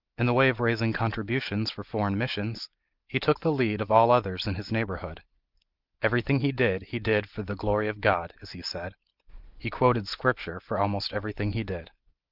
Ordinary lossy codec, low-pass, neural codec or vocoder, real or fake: Opus, 24 kbps; 5.4 kHz; none; real